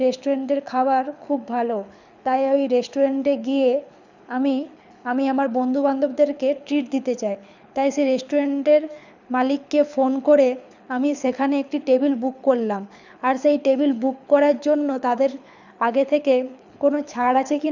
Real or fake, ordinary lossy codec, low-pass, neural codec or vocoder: fake; none; 7.2 kHz; codec, 24 kHz, 6 kbps, HILCodec